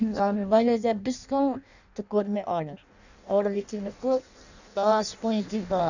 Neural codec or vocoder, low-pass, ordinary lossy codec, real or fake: codec, 16 kHz in and 24 kHz out, 0.6 kbps, FireRedTTS-2 codec; 7.2 kHz; none; fake